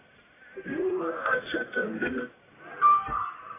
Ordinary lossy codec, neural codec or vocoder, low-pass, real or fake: AAC, 16 kbps; codec, 44.1 kHz, 1.7 kbps, Pupu-Codec; 3.6 kHz; fake